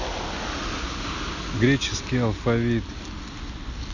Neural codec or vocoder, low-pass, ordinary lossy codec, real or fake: none; 7.2 kHz; none; real